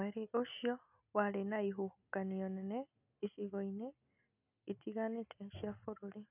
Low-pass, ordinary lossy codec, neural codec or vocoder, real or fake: 3.6 kHz; none; none; real